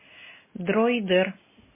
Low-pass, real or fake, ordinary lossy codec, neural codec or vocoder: 3.6 kHz; real; MP3, 16 kbps; none